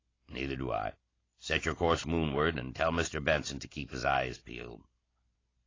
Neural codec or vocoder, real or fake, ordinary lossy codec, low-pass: none; real; AAC, 32 kbps; 7.2 kHz